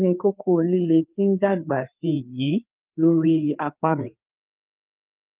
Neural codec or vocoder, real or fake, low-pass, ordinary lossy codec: codec, 16 kHz, 4 kbps, FreqCodec, larger model; fake; 3.6 kHz; Opus, 32 kbps